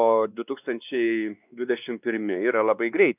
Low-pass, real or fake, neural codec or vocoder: 3.6 kHz; fake; codec, 16 kHz, 2 kbps, X-Codec, WavLM features, trained on Multilingual LibriSpeech